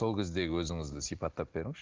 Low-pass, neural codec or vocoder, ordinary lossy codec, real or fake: 7.2 kHz; none; Opus, 16 kbps; real